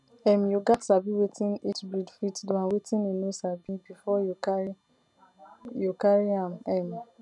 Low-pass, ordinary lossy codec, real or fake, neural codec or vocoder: 10.8 kHz; none; real; none